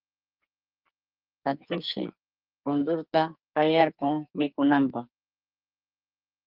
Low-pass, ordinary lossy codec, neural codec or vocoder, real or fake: 5.4 kHz; Opus, 32 kbps; codec, 44.1 kHz, 2.6 kbps, SNAC; fake